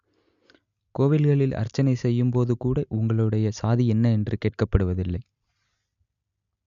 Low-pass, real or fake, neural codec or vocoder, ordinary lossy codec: 7.2 kHz; real; none; MP3, 96 kbps